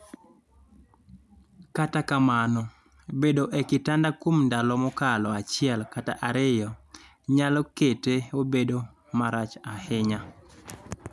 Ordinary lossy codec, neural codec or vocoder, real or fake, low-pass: none; none; real; none